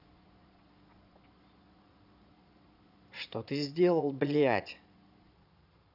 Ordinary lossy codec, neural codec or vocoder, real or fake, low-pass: none; vocoder, 22.05 kHz, 80 mel bands, Vocos; fake; 5.4 kHz